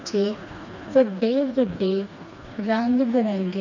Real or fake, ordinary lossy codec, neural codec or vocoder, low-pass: fake; none; codec, 16 kHz, 2 kbps, FreqCodec, smaller model; 7.2 kHz